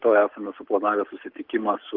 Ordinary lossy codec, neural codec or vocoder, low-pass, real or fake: Opus, 16 kbps; none; 5.4 kHz; real